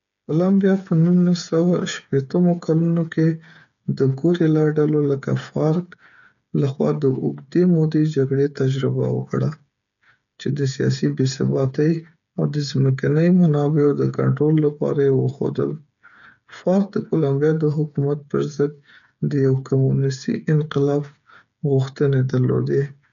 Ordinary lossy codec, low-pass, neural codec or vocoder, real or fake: none; 7.2 kHz; codec, 16 kHz, 8 kbps, FreqCodec, smaller model; fake